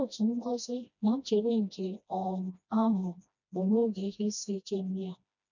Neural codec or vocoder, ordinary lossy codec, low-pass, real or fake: codec, 16 kHz, 1 kbps, FreqCodec, smaller model; none; 7.2 kHz; fake